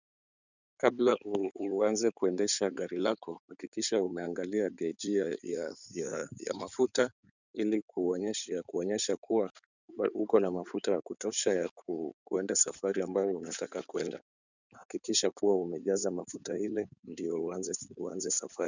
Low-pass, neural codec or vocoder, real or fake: 7.2 kHz; codec, 16 kHz in and 24 kHz out, 2.2 kbps, FireRedTTS-2 codec; fake